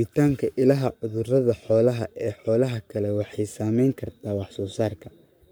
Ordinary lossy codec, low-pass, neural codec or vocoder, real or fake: none; none; codec, 44.1 kHz, 7.8 kbps, Pupu-Codec; fake